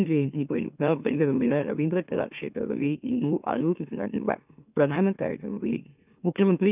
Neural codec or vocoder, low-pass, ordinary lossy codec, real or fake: autoencoder, 44.1 kHz, a latent of 192 numbers a frame, MeloTTS; 3.6 kHz; none; fake